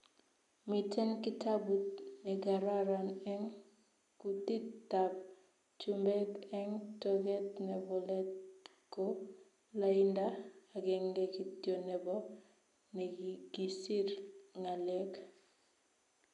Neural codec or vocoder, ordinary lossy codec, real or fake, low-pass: none; none; real; 10.8 kHz